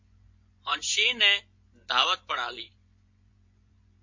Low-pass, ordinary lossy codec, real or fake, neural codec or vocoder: 7.2 kHz; MP3, 48 kbps; fake; vocoder, 24 kHz, 100 mel bands, Vocos